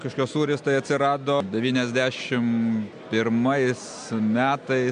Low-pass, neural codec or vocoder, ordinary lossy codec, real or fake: 9.9 kHz; none; MP3, 64 kbps; real